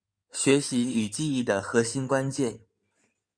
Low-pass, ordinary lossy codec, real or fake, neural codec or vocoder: 9.9 kHz; Opus, 64 kbps; fake; codec, 16 kHz in and 24 kHz out, 2.2 kbps, FireRedTTS-2 codec